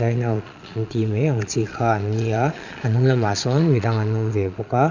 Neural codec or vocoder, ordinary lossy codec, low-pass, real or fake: vocoder, 22.05 kHz, 80 mel bands, Vocos; none; 7.2 kHz; fake